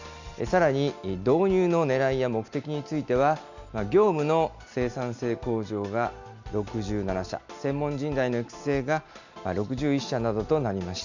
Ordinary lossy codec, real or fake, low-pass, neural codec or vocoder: none; real; 7.2 kHz; none